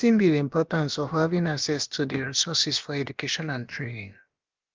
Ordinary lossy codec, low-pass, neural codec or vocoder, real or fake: Opus, 32 kbps; 7.2 kHz; codec, 16 kHz, about 1 kbps, DyCAST, with the encoder's durations; fake